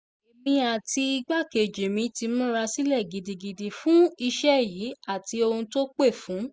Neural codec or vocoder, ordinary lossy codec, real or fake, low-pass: none; none; real; none